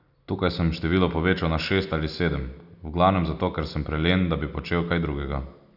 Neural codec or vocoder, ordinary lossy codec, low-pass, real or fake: none; none; 5.4 kHz; real